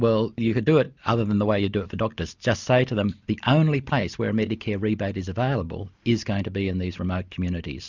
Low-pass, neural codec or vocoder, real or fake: 7.2 kHz; none; real